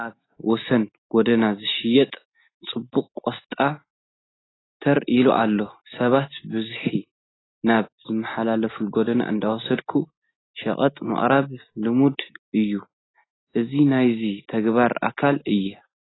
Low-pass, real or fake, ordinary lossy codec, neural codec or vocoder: 7.2 kHz; real; AAC, 16 kbps; none